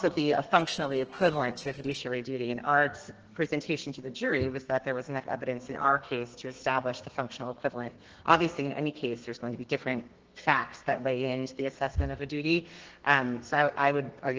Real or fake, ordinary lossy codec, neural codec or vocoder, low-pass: fake; Opus, 16 kbps; codec, 32 kHz, 1.9 kbps, SNAC; 7.2 kHz